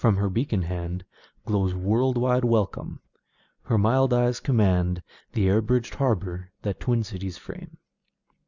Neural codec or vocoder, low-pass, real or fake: none; 7.2 kHz; real